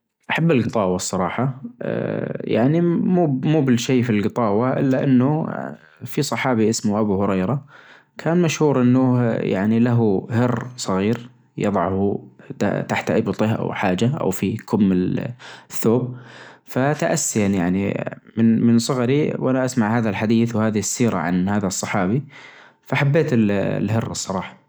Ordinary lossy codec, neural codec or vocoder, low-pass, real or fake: none; none; none; real